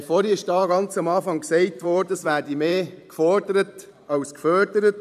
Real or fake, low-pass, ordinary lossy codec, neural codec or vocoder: fake; 14.4 kHz; none; vocoder, 44.1 kHz, 128 mel bands every 512 samples, BigVGAN v2